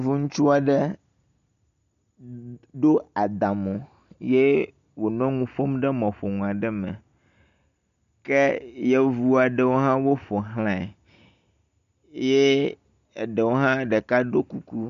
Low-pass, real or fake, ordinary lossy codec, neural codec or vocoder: 7.2 kHz; real; MP3, 96 kbps; none